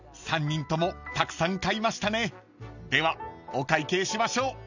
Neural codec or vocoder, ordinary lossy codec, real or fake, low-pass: none; none; real; 7.2 kHz